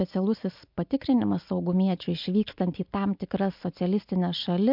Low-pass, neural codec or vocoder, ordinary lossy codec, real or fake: 5.4 kHz; none; MP3, 48 kbps; real